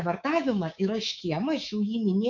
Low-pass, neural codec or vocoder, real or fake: 7.2 kHz; codec, 24 kHz, 3.1 kbps, DualCodec; fake